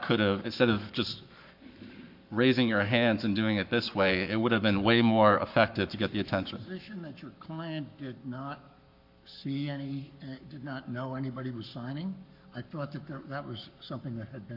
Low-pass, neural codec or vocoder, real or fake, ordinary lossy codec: 5.4 kHz; codec, 44.1 kHz, 7.8 kbps, Pupu-Codec; fake; MP3, 48 kbps